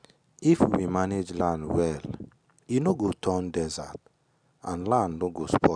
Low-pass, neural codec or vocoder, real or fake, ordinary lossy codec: 9.9 kHz; none; real; none